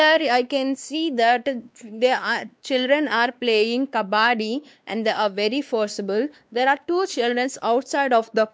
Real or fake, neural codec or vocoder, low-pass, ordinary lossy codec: fake; codec, 16 kHz, 2 kbps, X-Codec, WavLM features, trained on Multilingual LibriSpeech; none; none